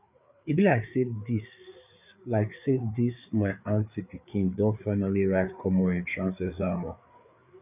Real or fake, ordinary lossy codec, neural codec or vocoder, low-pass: fake; none; codec, 16 kHz, 4 kbps, FreqCodec, larger model; 3.6 kHz